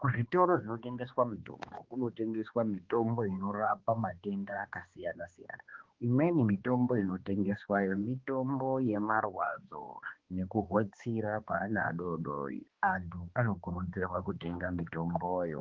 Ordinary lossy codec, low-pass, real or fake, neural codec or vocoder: Opus, 16 kbps; 7.2 kHz; fake; codec, 16 kHz, 2 kbps, X-Codec, HuBERT features, trained on balanced general audio